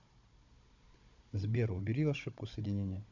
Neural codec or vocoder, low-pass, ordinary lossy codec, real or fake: codec, 16 kHz, 16 kbps, FunCodec, trained on Chinese and English, 50 frames a second; 7.2 kHz; MP3, 64 kbps; fake